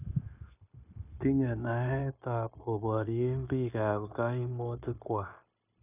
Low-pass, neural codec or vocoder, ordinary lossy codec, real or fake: 3.6 kHz; codec, 16 kHz, 0.9 kbps, LongCat-Audio-Codec; none; fake